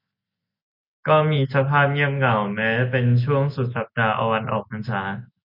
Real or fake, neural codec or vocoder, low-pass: real; none; 5.4 kHz